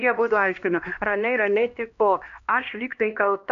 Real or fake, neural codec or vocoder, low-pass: fake; codec, 16 kHz, 1 kbps, X-Codec, HuBERT features, trained on LibriSpeech; 7.2 kHz